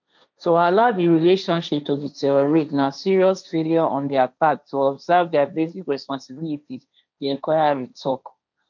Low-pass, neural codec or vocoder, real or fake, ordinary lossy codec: 7.2 kHz; codec, 16 kHz, 1.1 kbps, Voila-Tokenizer; fake; none